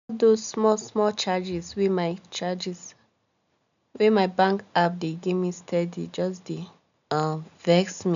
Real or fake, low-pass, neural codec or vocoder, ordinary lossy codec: real; 7.2 kHz; none; MP3, 96 kbps